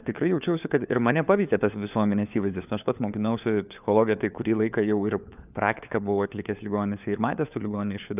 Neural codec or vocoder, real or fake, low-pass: codec, 16 kHz, 4 kbps, FunCodec, trained on LibriTTS, 50 frames a second; fake; 3.6 kHz